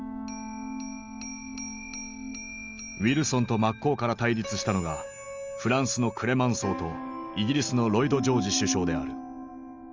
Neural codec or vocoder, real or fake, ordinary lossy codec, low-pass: none; real; Opus, 32 kbps; 7.2 kHz